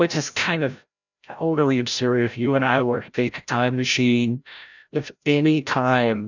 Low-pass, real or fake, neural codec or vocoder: 7.2 kHz; fake; codec, 16 kHz, 0.5 kbps, FreqCodec, larger model